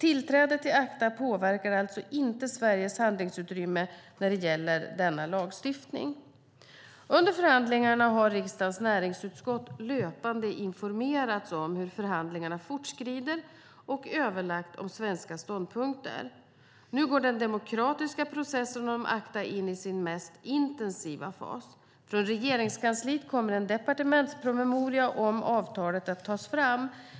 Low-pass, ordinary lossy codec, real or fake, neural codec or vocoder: none; none; real; none